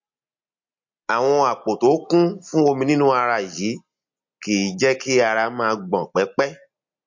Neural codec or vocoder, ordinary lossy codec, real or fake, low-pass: none; MP3, 48 kbps; real; 7.2 kHz